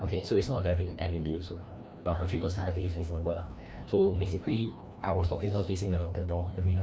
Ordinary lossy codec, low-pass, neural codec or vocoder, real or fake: none; none; codec, 16 kHz, 1 kbps, FreqCodec, larger model; fake